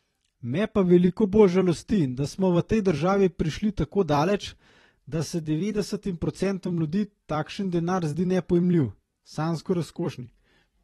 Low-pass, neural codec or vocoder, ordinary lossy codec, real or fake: 19.8 kHz; vocoder, 44.1 kHz, 128 mel bands every 256 samples, BigVGAN v2; AAC, 32 kbps; fake